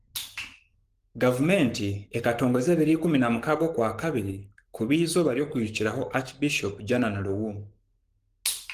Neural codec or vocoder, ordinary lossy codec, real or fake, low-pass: codec, 44.1 kHz, 7.8 kbps, Pupu-Codec; Opus, 24 kbps; fake; 14.4 kHz